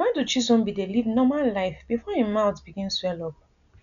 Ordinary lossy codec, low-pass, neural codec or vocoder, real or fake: none; 7.2 kHz; none; real